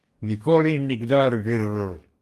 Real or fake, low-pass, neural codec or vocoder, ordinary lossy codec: fake; 14.4 kHz; codec, 44.1 kHz, 2.6 kbps, DAC; Opus, 32 kbps